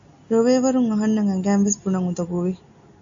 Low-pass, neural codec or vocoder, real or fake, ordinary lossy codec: 7.2 kHz; none; real; AAC, 64 kbps